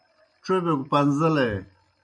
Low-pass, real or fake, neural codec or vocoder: 9.9 kHz; real; none